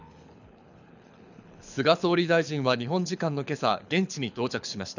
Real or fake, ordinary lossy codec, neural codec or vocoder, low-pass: fake; none; codec, 24 kHz, 6 kbps, HILCodec; 7.2 kHz